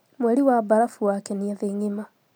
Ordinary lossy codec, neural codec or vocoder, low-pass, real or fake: none; none; none; real